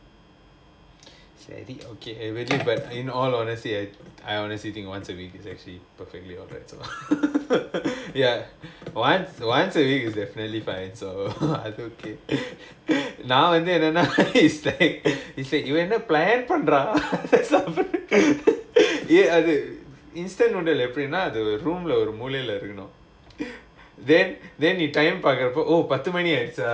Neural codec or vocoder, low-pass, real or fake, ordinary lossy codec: none; none; real; none